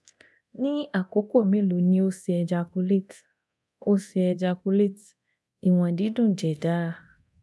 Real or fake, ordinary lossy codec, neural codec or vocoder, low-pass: fake; none; codec, 24 kHz, 0.9 kbps, DualCodec; none